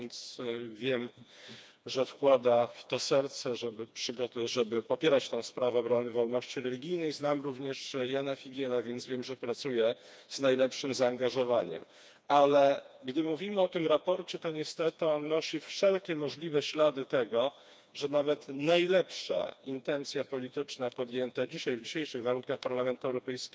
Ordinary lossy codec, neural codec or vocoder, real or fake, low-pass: none; codec, 16 kHz, 2 kbps, FreqCodec, smaller model; fake; none